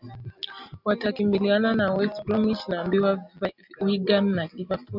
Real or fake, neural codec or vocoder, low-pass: real; none; 5.4 kHz